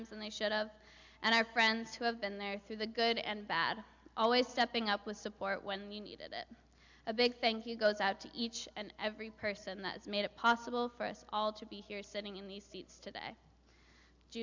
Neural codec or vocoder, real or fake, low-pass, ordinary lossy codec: none; real; 7.2 kHz; MP3, 64 kbps